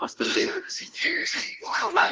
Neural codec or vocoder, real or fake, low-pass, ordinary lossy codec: codec, 16 kHz, 0.5 kbps, FunCodec, trained on LibriTTS, 25 frames a second; fake; 7.2 kHz; Opus, 32 kbps